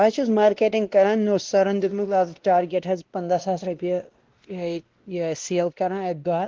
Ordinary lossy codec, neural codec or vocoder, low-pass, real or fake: Opus, 16 kbps; codec, 16 kHz, 1 kbps, X-Codec, WavLM features, trained on Multilingual LibriSpeech; 7.2 kHz; fake